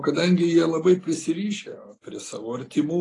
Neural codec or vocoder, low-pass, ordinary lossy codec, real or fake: none; 10.8 kHz; AAC, 32 kbps; real